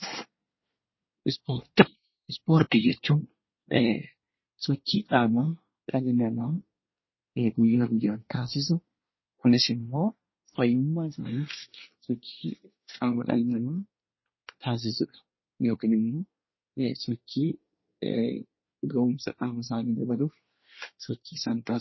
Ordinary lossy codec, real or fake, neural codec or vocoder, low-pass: MP3, 24 kbps; fake; codec, 24 kHz, 1 kbps, SNAC; 7.2 kHz